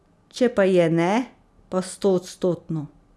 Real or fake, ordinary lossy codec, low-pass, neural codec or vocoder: real; none; none; none